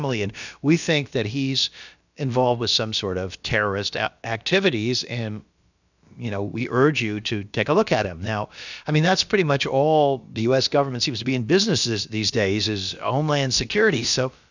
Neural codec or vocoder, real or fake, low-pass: codec, 16 kHz, about 1 kbps, DyCAST, with the encoder's durations; fake; 7.2 kHz